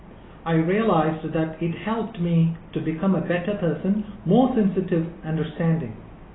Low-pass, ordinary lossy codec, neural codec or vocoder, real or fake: 7.2 kHz; AAC, 16 kbps; none; real